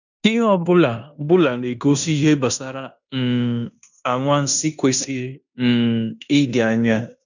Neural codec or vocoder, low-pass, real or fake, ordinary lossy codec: codec, 16 kHz in and 24 kHz out, 0.9 kbps, LongCat-Audio-Codec, fine tuned four codebook decoder; 7.2 kHz; fake; none